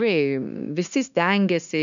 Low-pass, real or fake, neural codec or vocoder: 7.2 kHz; fake; codec, 16 kHz, 0.9 kbps, LongCat-Audio-Codec